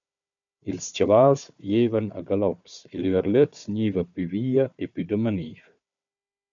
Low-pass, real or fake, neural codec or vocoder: 7.2 kHz; fake; codec, 16 kHz, 4 kbps, FunCodec, trained on Chinese and English, 50 frames a second